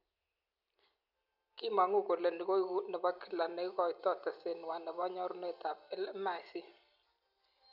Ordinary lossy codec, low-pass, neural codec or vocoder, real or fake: none; 5.4 kHz; none; real